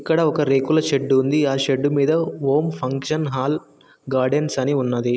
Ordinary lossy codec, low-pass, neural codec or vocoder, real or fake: none; none; none; real